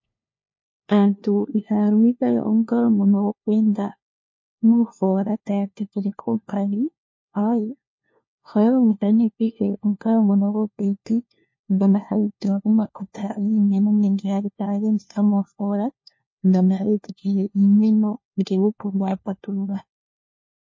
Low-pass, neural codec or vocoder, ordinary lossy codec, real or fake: 7.2 kHz; codec, 16 kHz, 1 kbps, FunCodec, trained on LibriTTS, 50 frames a second; MP3, 32 kbps; fake